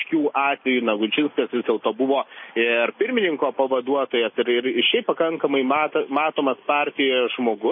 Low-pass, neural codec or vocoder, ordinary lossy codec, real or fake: 7.2 kHz; none; MP3, 24 kbps; real